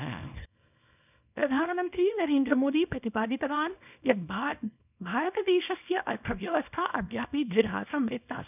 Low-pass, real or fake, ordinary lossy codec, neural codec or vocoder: 3.6 kHz; fake; none; codec, 24 kHz, 0.9 kbps, WavTokenizer, small release